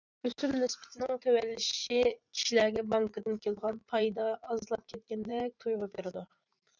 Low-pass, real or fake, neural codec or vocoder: 7.2 kHz; fake; vocoder, 44.1 kHz, 80 mel bands, Vocos